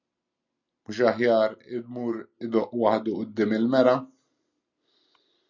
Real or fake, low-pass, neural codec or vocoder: real; 7.2 kHz; none